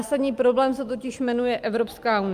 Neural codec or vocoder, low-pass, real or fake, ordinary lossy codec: none; 14.4 kHz; real; Opus, 32 kbps